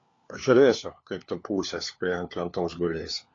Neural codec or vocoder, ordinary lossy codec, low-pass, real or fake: codec, 16 kHz, 4 kbps, FunCodec, trained on LibriTTS, 50 frames a second; AAC, 32 kbps; 7.2 kHz; fake